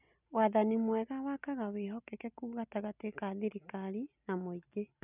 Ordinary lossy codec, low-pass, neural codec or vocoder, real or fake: none; 3.6 kHz; none; real